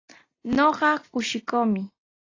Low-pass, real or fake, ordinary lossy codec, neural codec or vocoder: 7.2 kHz; real; AAC, 32 kbps; none